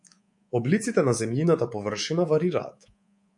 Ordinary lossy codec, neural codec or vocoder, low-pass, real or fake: MP3, 48 kbps; codec, 24 kHz, 3.1 kbps, DualCodec; 10.8 kHz; fake